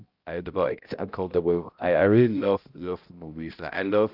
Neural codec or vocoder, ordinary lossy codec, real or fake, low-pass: codec, 16 kHz, 0.5 kbps, X-Codec, HuBERT features, trained on balanced general audio; Opus, 24 kbps; fake; 5.4 kHz